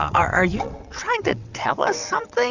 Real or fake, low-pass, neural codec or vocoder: fake; 7.2 kHz; vocoder, 22.05 kHz, 80 mel bands, WaveNeXt